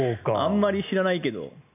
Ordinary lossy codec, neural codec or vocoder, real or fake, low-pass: none; none; real; 3.6 kHz